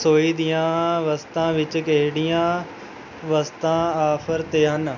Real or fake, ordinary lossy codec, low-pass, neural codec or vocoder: real; none; 7.2 kHz; none